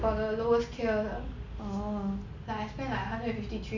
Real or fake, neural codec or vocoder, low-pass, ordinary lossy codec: real; none; 7.2 kHz; none